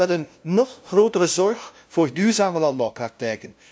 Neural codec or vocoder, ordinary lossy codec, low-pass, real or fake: codec, 16 kHz, 0.5 kbps, FunCodec, trained on LibriTTS, 25 frames a second; none; none; fake